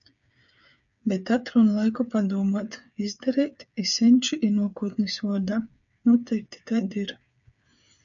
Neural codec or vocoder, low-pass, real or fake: codec, 16 kHz, 8 kbps, FreqCodec, smaller model; 7.2 kHz; fake